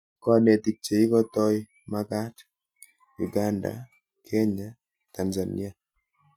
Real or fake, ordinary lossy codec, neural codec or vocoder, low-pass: real; none; none; 19.8 kHz